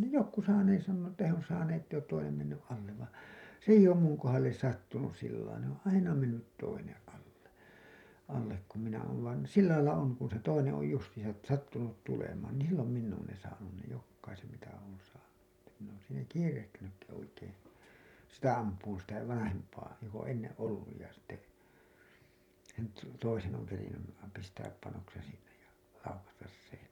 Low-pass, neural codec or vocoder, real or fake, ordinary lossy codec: 19.8 kHz; none; real; none